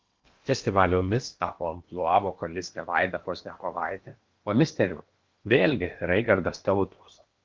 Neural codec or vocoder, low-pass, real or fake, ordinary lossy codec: codec, 16 kHz in and 24 kHz out, 0.8 kbps, FocalCodec, streaming, 65536 codes; 7.2 kHz; fake; Opus, 32 kbps